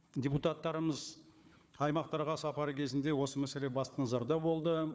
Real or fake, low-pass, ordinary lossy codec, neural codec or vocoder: fake; none; none; codec, 16 kHz, 4 kbps, FunCodec, trained on Chinese and English, 50 frames a second